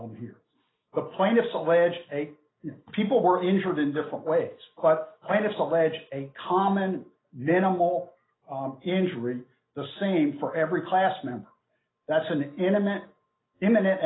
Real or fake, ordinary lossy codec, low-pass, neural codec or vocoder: real; AAC, 16 kbps; 7.2 kHz; none